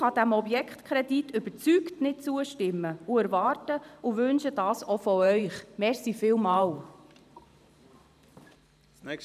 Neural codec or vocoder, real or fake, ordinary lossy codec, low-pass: vocoder, 44.1 kHz, 128 mel bands every 512 samples, BigVGAN v2; fake; none; 14.4 kHz